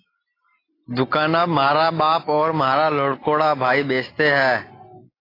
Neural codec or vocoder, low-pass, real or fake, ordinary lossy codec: none; 5.4 kHz; real; AAC, 24 kbps